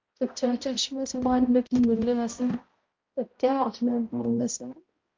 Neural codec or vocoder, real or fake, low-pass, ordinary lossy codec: codec, 16 kHz, 0.5 kbps, X-Codec, HuBERT features, trained on balanced general audio; fake; 7.2 kHz; Opus, 24 kbps